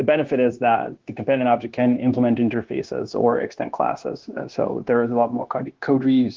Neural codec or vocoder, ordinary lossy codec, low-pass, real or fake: codec, 16 kHz, 0.9 kbps, LongCat-Audio-Codec; Opus, 16 kbps; 7.2 kHz; fake